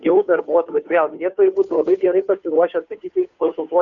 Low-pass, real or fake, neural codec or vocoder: 7.2 kHz; fake; codec, 16 kHz, 2 kbps, FunCodec, trained on Chinese and English, 25 frames a second